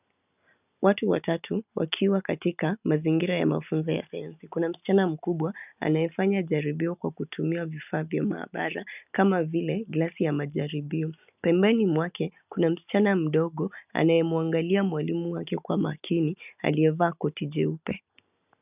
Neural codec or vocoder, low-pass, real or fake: none; 3.6 kHz; real